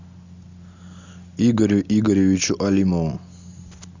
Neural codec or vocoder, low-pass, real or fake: none; 7.2 kHz; real